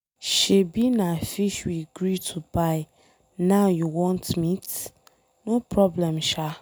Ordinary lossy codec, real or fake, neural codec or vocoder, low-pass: none; real; none; none